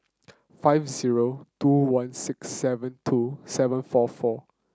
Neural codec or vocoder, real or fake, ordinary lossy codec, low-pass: none; real; none; none